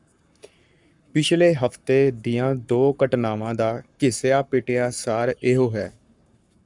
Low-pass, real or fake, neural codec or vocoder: 10.8 kHz; fake; codec, 44.1 kHz, 7.8 kbps, Pupu-Codec